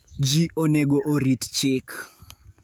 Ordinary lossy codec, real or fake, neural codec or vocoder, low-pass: none; fake; codec, 44.1 kHz, 7.8 kbps, DAC; none